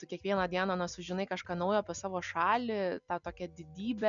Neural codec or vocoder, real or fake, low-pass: none; real; 7.2 kHz